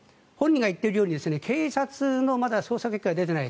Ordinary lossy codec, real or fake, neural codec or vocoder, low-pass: none; real; none; none